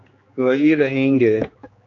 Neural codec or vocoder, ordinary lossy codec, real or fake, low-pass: codec, 16 kHz, 2 kbps, X-Codec, HuBERT features, trained on general audio; AAC, 48 kbps; fake; 7.2 kHz